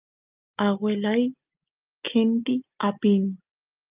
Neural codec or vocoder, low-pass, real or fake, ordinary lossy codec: none; 3.6 kHz; real; Opus, 24 kbps